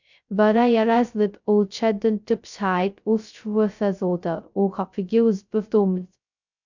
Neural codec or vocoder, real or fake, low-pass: codec, 16 kHz, 0.2 kbps, FocalCodec; fake; 7.2 kHz